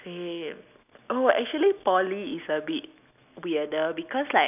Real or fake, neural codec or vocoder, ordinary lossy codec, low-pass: real; none; none; 3.6 kHz